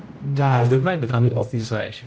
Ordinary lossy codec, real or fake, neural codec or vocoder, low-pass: none; fake; codec, 16 kHz, 0.5 kbps, X-Codec, HuBERT features, trained on general audio; none